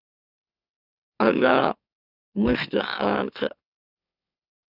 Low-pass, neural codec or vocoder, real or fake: 5.4 kHz; autoencoder, 44.1 kHz, a latent of 192 numbers a frame, MeloTTS; fake